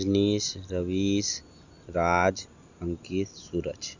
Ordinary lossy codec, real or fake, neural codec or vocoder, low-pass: Opus, 64 kbps; real; none; 7.2 kHz